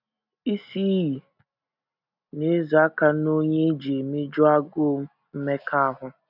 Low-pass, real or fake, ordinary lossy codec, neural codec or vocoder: 5.4 kHz; real; none; none